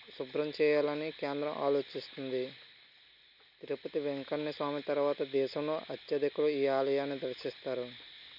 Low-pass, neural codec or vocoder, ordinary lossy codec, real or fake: 5.4 kHz; none; none; real